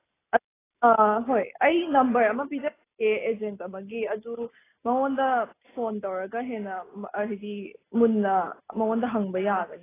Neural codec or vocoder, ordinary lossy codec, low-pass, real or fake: none; AAC, 16 kbps; 3.6 kHz; real